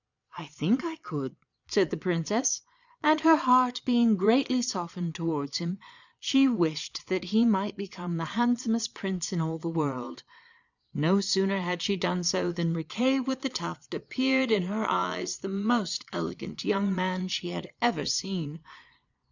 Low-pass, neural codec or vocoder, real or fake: 7.2 kHz; vocoder, 22.05 kHz, 80 mel bands, Vocos; fake